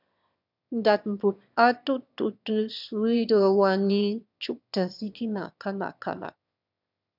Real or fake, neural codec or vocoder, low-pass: fake; autoencoder, 22.05 kHz, a latent of 192 numbers a frame, VITS, trained on one speaker; 5.4 kHz